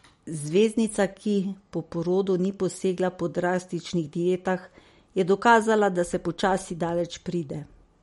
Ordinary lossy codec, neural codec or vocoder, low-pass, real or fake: MP3, 48 kbps; none; 19.8 kHz; real